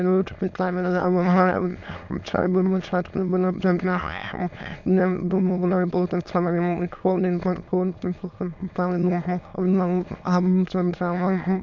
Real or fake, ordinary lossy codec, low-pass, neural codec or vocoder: fake; MP3, 64 kbps; 7.2 kHz; autoencoder, 22.05 kHz, a latent of 192 numbers a frame, VITS, trained on many speakers